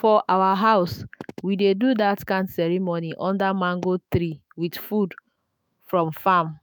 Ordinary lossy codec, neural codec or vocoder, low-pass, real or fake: none; autoencoder, 48 kHz, 128 numbers a frame, DAC-VAE, trained on Japanese speech; none; fake